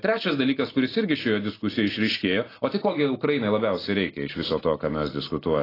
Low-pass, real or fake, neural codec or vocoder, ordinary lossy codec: 5.4 kHz; real; none; AAC, 24 kbps